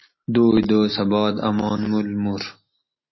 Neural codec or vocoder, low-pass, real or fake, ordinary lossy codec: none; 7.2 kHz; real; MP3, 24 kbps